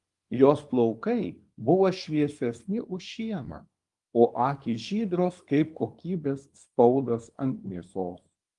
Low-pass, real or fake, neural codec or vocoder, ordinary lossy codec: 10.8 kHz; fake; codec, 24 kHz, 0.9 kbps, WavTokenizer, small release; Opus, 32 kbps